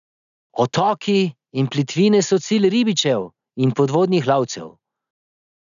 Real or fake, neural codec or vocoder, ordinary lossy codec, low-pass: real; none; none; 7.2 kHz